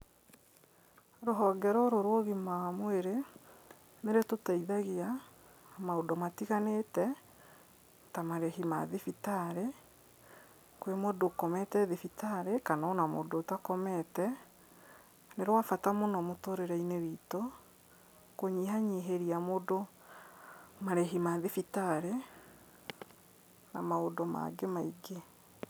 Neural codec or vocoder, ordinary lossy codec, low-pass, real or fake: none; none; none; real